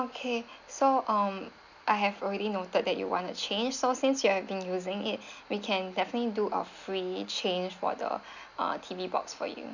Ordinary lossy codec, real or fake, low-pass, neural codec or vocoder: none; real; 7.2 kHz; none